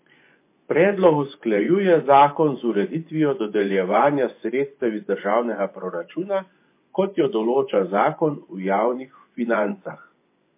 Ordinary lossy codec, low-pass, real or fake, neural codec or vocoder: MP3, 24 kbps; 3.6 kHz; fake; vocoder, 24 kHz, 100 mel bands, Vocos